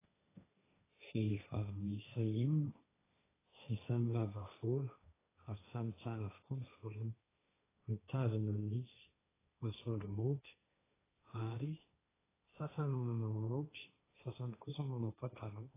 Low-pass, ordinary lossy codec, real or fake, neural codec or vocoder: 3.6 kHz; AAC, 16 kbps; fake; codec, 16 kHz, 1.1 kbps, Voila-Tokenizer